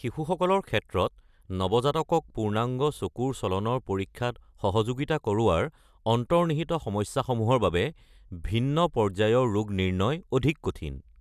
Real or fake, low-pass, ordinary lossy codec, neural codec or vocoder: real; 14.4 kHz; none; none